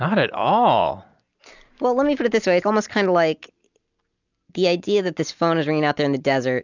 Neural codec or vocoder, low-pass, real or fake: none; 7.2 kHz; real